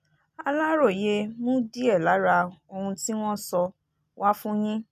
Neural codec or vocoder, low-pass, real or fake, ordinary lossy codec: none; 14.4 kHz; real; none